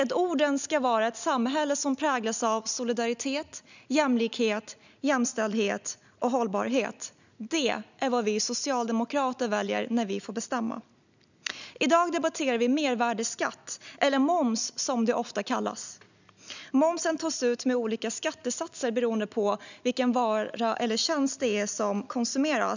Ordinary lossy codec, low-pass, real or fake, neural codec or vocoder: none; 7.2 kHz; real; none